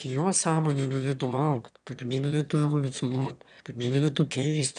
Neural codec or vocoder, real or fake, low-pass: autoencoder, 22.05 kHz, a latent of 192 numbers a frame, VITS, trained on one speaker; fake; 9.9 kHz